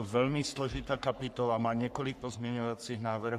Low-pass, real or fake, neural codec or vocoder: 14.4 kHz; fake; codec, 44.1 kHz, 3.4 kbps, Pupu-Codec